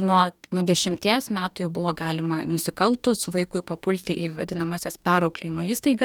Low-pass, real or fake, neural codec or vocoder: 19.8 kHz; fake; codec, 44.1 kHz, 2.6 kbps, DAC